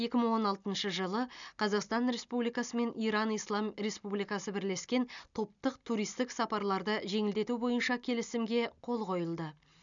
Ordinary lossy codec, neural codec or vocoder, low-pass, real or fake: none; none; 7.2 kHz; real